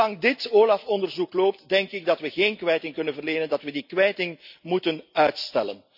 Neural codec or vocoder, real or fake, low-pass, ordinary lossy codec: none; real; 5.4 kHz; none